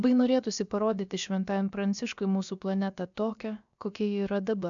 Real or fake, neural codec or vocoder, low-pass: fake; codec, 16 kHz, about 1 kbps, DyCAST, with the encoder's durations; 7.2 kHz